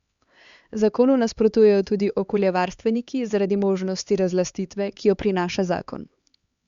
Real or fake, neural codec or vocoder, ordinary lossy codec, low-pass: fake; codec, 16 kHz, 4 kbps, X-Codec, HuBERT features, trained on LibriSpeech; Opus, 64 kbps; 7.2 kHz